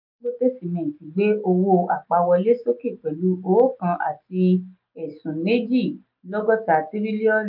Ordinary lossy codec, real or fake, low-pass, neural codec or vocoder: MP3, 48 kbps; real; 5.4 kHz; none